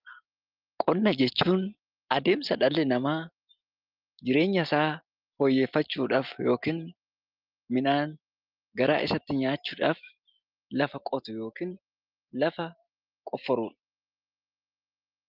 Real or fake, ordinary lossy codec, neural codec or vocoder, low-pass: real; Opus, 24 kbps; none; 5.4 kHz